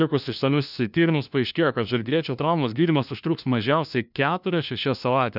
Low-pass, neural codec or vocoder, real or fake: 5.4 kHz; codec, 16 kHz, 1 kbps, FunCodec, trained on LibriTTS, 50 frames a second; fake